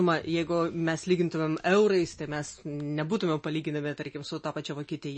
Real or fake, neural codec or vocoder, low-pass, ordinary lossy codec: real; none; 9.9 kHz; MP3, 32 kbps